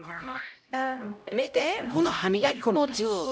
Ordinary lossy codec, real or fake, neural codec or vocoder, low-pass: none; fake; codec, 16 kHz, 0.5 kbps, X-Codec, HuBERT features, trained on LibriSpeech; none